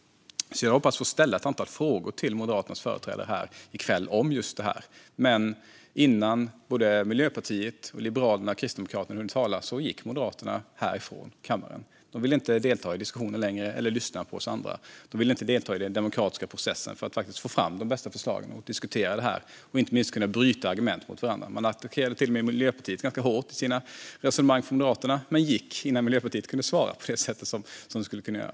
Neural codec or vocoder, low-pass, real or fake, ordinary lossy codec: none; none; real; none